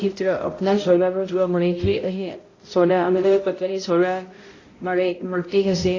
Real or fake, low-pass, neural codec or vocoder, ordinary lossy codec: fake; 7.2 kHz; codec, 16 kHz, 0.5 kbps, X-Codec, HuBERT features, trained on balanced general audio; AAC, 32 kbps